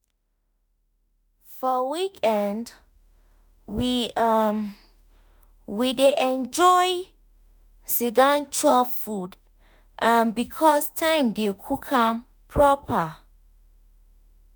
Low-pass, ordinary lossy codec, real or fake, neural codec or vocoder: none; none; fake; autoencoder, 48 kHz, 32 numbers a frame, DAC-VAE, trained on Japanese speech